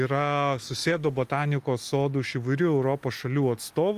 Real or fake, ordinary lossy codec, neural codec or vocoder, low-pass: real; Opus, 32 kbps; none; 14.4 kHz